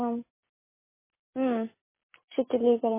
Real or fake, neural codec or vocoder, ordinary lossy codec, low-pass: real; none; MP3, 16 kbps; 3.6 kHz